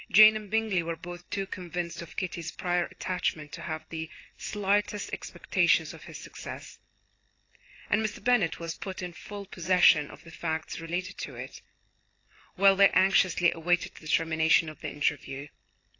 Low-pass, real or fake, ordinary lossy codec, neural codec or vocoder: 7.2 kHz; real; AAC, 32 kbps; none